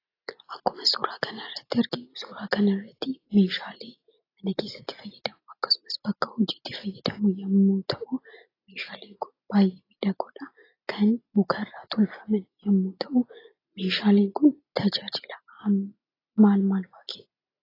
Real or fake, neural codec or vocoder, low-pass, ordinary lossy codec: real; none; 5.4 kHz; AAC, 24 kbps